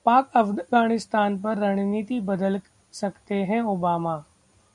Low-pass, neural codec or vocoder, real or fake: 10.8 kHz; none; real